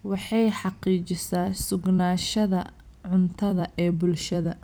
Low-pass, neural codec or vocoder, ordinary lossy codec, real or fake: none; vocoder, 44.1 kHz, 128 mel bands every 256 samples, BigVGAN v2; none; fake